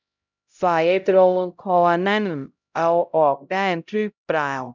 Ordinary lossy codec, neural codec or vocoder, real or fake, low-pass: none; codec, 16 kHz, 0.5 kbps, X-Codec, HuBERT features, trained on LibriSpeech; fake; 7.2 kHz